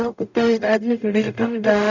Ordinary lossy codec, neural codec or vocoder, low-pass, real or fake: none; codec, 44.1 kHz, 0.9 kbps, DAC; 7.2 kHz; fake